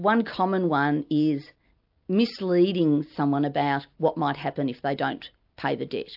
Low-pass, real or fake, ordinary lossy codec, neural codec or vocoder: 5.4 kHz; real; AAC, 48 kbps; none